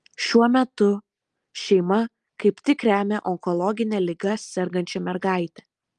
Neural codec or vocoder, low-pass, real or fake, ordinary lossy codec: none; 10.8 kHz; real; Opus, 32 kbps